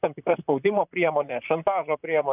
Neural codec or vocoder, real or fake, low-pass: vocoder, 44.1 kHz, 128 mel bands, Pupu-Vocoder; fake; 3.6 kHz